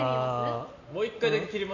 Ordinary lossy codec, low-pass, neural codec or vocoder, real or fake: none; 7.2 kHz; none; real